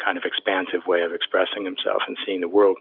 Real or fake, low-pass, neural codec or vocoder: real; 5.4 kHz; none